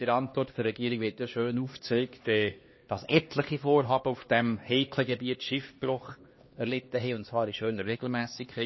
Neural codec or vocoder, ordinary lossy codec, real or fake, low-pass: codec, 16 kHz, 2 kbps, X-Codec, HuBERT features, trained on LibriSpeech; MP3, 24 kbps; fake; 7.2 kHz